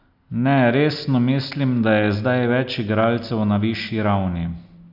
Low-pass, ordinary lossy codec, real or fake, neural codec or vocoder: 5.4 kHz; none; real; none